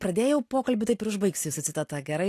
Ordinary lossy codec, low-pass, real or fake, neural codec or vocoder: AAC, 64 kbps; 14.4 kHz; real; none